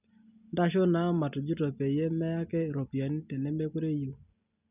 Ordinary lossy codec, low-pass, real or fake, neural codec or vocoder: none; 3.6 kHz; real; none